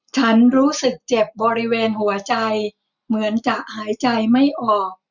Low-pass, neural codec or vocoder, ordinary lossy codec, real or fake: 7.2 kHz; none; none; real